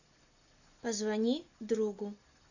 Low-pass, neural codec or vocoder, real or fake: 7.2 kHz; none; real